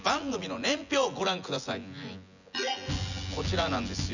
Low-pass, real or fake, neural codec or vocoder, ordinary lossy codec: 7.2 kHz; fake; vocoder, 24 kHz, 100 mel bands, Vocos; none